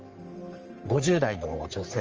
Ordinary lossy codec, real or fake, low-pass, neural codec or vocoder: Opus, 24 kbps; fake; 7.2 kHz; codec, 44.1 kHz, 3.4 kbps, Pupu-Codec